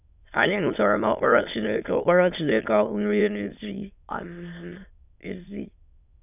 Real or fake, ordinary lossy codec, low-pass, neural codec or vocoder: fake; none; 3.6 kHz; autoencoder, 22.05 kHz, a latent of 192 numbers a frame, VITS, trained on many speakers